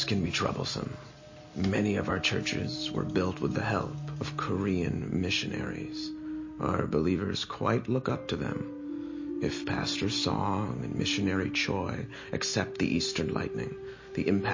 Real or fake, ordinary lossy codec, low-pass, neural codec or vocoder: real; MP3, 32 kbps; 7.2 kHz; none